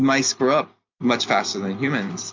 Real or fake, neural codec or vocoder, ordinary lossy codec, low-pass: real; none; MP3, 64 kbps; 7.2 kHz